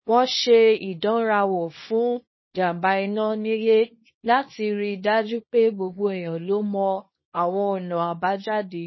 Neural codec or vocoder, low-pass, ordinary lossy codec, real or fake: codec, 24 kHz, 0.9 kbps, WavTokenizer, small release; 7.2 kHz; MP3, 24 kbps; fake